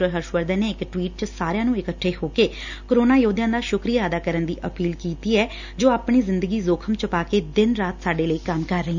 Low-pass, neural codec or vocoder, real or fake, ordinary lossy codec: 7.2 kHz; none; real; none